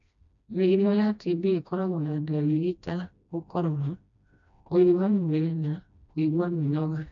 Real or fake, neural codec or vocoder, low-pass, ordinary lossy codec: fake; codec, 16 kHz, 1 kbps, FreqCodec, smaller model; 7.2 kHz; none